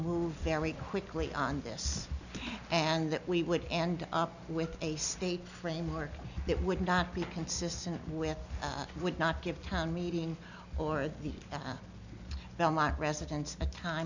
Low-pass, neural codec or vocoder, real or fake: 7.2 kHz; none; real